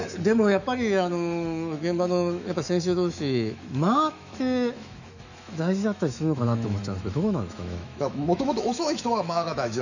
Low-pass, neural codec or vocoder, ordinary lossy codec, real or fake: 7.2 kHz; autoencoder, 48 kHz, 128 numbers a frame, DAC-VAE, trained on Japanese speech; none; fake